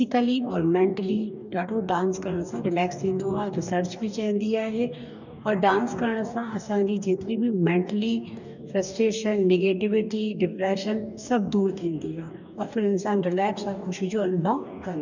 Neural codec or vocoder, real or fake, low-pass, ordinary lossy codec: codec, 44.1 kHz, 2.6 kbps, DAC; fake; 7.2 kHz; none